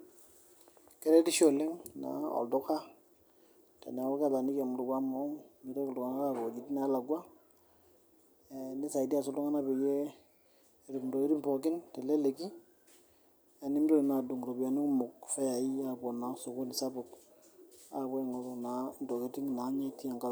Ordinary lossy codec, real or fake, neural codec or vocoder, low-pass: none; real; none; none